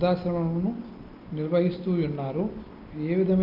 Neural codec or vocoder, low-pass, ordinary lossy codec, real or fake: none; 5.4 kHz; Opus, 24 kbps; real